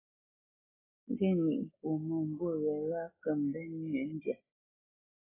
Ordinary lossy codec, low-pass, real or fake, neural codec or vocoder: AAC, 16 kbps; 3.6 kHz; real; none